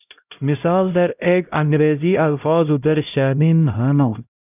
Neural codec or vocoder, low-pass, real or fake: codec, 16 kHz, 0.5 kbps, X-Codec, HuBERT features, trained on LibriSpeech; 3.6 kHz; fake